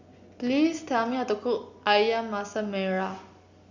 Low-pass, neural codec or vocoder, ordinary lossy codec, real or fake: 7.2 kHz; none; Opus, 64 kbps; real